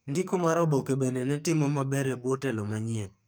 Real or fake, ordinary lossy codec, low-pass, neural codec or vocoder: fake; none; none; codec, 44.1 kHz, 2.6 kbps, SNAC